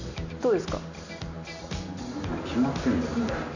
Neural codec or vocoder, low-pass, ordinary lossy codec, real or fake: none; 7.2 kHz; none; real